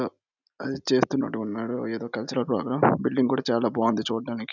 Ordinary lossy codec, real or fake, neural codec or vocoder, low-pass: none; real; none; 7.2 kHz